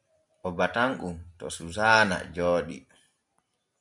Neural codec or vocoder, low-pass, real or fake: none; 10.8 kHz; real